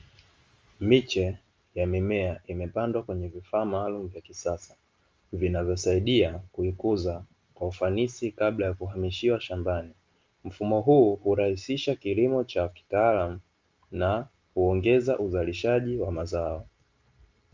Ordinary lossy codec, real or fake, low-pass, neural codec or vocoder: Opus, 32 kbps; real; 7.2 kHz; none